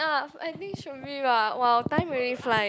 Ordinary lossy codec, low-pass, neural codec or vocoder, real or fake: none; none; none; real